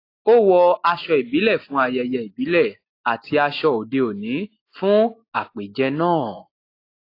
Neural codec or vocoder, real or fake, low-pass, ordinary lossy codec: none; real; 5.4 kHz; AAC, 32 kbps